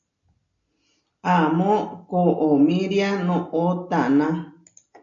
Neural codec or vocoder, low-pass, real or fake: none; 7.2 kHz; real